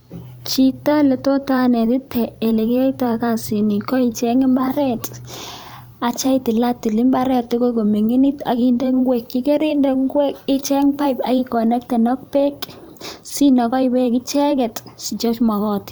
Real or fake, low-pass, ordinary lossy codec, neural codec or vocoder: fake; none; none; vocoder, 44.1 kHz, 128 mel bands every 512 samples, BigVGAN v2